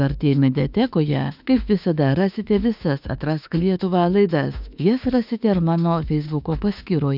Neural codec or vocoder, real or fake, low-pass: autoencoder, 48 kHz, 32 numbers a frame, DAC-VAE, trained on Japanese speech; fake; 5.4 kHz